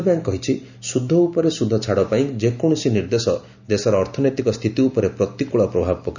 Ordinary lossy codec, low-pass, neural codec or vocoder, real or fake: none; 7.2 kHz; none; real